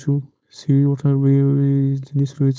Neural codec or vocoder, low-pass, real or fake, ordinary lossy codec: codec, 16 kHz, 4.8 kbps, FACodec; none; fake; none